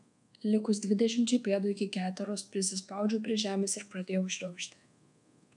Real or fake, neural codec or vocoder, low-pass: fake; codec, 24 kHz, 1.2 kbps, DualCodec; 10.8 kHz